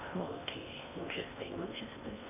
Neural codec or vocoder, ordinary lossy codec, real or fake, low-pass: codec, 16 kHz in and 24 kHz out, 0.6 kbps, FocalCodec, streaming, 4096 codes; none; fake; 3.6 kHz